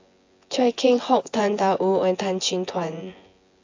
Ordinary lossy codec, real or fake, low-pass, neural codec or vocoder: AAC, 48 kbps; fake; 7.2 kHz; vocoder, 24 kHz, 100 mel bands, Vocos